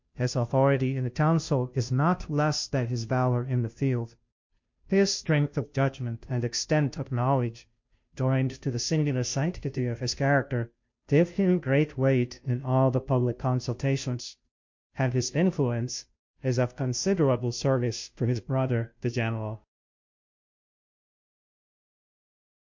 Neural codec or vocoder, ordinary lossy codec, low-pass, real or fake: codec, 16 kHz, 0.5 kbps, FunCodec, trained on Chinese and English, 25 frames a second; MP3, 48 kbps; 7.2 kHz; fake